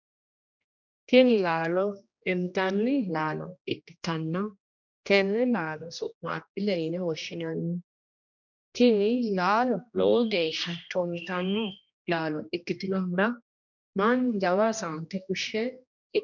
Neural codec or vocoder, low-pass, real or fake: codec, 16 kHz, 1 kbps, X-Codec, HuBERT features, trained on general audio; 7.2 kHz; fake